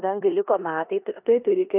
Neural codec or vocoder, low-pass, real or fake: codec, 16 kHz in and 24 kHz out, 0.9 kbps, LongCat-Audio-Codec, four codebook decoder; 3.6 kHz; fake